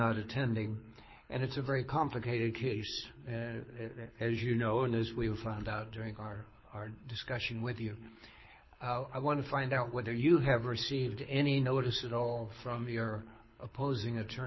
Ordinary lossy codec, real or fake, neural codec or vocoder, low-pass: MP3, 24 kbps; fake; codec, 24 kHz, 6 kbps, HILCodec; 7.2 kHz